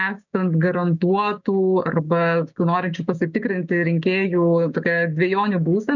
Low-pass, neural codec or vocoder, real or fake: 7.2 kHz; codec, 16 kHz, 6 kbps, DAC; fake